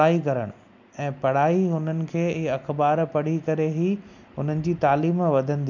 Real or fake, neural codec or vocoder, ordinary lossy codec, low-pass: real; none; MP3, 64 kbps; 7.2 kHz